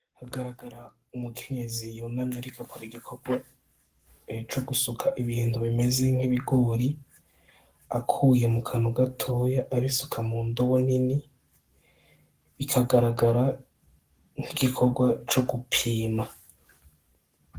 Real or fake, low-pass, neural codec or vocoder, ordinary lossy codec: fake; 14.4 kHz; codec, 44.1 kHz, 7.8 kbps, Pupu-Codec; Opus, 24 kbps